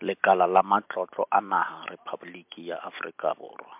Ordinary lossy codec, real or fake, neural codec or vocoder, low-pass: MP3, 32 kbps; real; none; 3.6 kHz